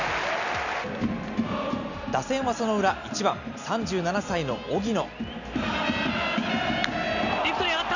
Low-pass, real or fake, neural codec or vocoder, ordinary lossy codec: 7.2 kHz; real; none; none